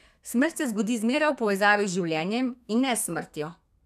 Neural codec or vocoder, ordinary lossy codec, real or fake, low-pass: codec, 32 kHz, 1.9 kbps, SNAC; none; fake; 14.4 kHz